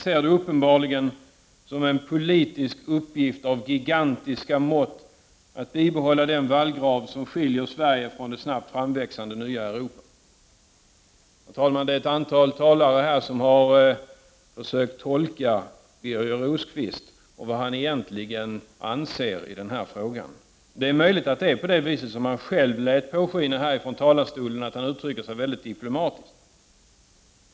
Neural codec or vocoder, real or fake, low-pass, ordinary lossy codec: none; real; none; none